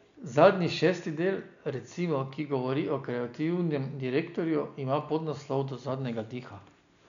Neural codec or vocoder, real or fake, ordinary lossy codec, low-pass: none; real; none; 7.2 kHz